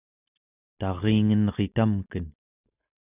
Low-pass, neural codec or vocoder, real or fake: 3.6 kHz; none; real